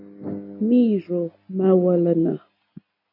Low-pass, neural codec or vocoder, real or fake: 5.4 kHz; none; real